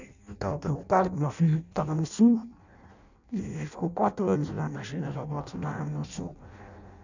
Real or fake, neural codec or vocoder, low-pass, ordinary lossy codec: fake; codec, 16 kHz in and 24 kHz out, 0.6 kbps, FireRedTTS-2 codec; 7.2 kHz; none